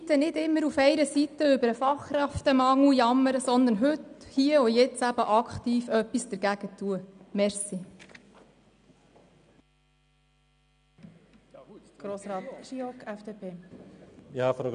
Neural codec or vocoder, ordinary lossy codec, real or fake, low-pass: none; MP3, 96 kbps; real; 9.9 kHz